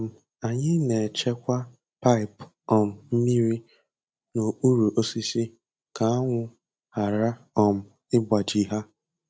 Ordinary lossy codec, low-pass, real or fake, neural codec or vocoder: none; none; real; none